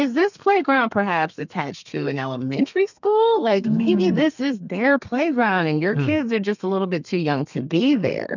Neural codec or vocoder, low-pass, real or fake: codec, 32 kHz, 1.9 kbps, SNAC; 7.2 kHz; fake